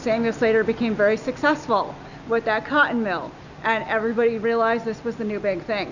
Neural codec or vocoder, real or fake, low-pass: none; real; 7.2 kHz